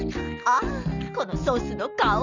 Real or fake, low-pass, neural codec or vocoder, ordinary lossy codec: real; 7.2 kHz; none; none